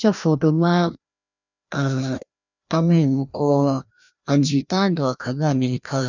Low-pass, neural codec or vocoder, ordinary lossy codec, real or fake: 7.2 kHz; codec, 16 kHz, 1 kbps, FreqCodec, larger model; none; fake